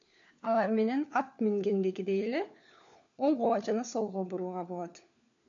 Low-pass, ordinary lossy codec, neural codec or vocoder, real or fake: 7.2 kHz; AAC, 64 kbps; codec, 16 kHz, 4 kbps, FunCodec, trained on LibriTTS, 50 frames a second; fake